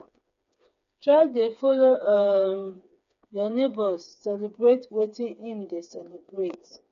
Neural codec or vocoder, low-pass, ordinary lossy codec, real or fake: codec, 16 kHz, 4 kbps, FreqCodec, smaller model; 7.2 kHz; none; fake